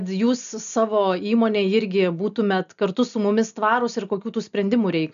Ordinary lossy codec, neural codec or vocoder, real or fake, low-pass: MP3, 96 kbps; none; real; 7.2 kHz